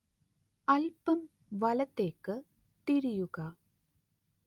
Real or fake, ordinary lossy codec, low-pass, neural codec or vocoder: real; Opus, 32 kbps; 19.8 kHz; none